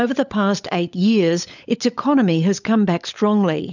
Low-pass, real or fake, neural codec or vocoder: 7.2 kHz; fake; codec, 16 kHz, 16 kbps, FunCodec, trained on LibriTTS, 50 frames a second